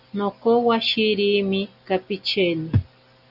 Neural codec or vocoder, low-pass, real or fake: none; 5.4 kHz; real